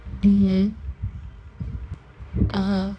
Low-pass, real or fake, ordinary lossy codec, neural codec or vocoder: 9.9 kHz; fake; none; codec, 24 kHz, 0.9 kbps, WavTokenizer, medium music audio release